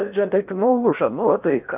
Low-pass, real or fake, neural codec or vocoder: 3.6 kHz; fake; codec, 16 kHz in and 24 kHz out, 0.8 kbps, FocalCodec, streaming, 65536 codes